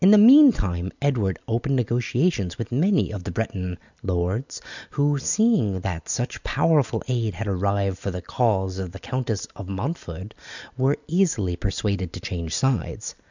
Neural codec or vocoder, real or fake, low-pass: none; real; 7.2 kHz